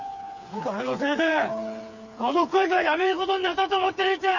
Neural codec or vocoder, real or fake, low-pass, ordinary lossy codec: codec, 16 kHz, 4 kbps, FreqCodec, smaller model; fake; 7.2 kHz; none